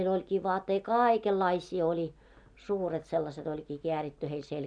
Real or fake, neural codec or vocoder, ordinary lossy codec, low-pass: real; none; none; 9.9 kHz